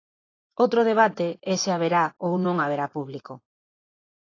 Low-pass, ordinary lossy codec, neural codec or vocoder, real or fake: 7.2 kHz; AAC, 32 kbps; vocoder, 24 kHz, 100 mel bands, Vocos; fake